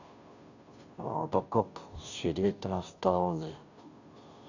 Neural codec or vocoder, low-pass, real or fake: codec, 16 kHz, 0.5 kbps, FunCodec, trained on Chinese and English, 25 frames a second; 7.2 kHz; fake